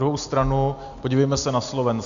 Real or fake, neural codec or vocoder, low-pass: real; none; 7.2 kHz